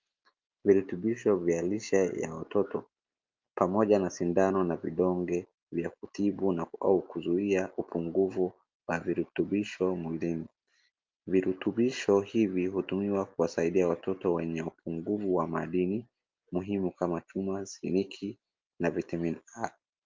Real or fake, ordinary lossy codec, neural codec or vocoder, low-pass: real; Opus, 32 kbps; none; 7.2 kHz